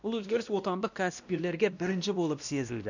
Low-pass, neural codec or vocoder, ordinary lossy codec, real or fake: 7.2 kHz; codec, 16 kHz, 1 kbps, X-Codec, WavLM features, trained on Multilingual LibriSpeech; none; fake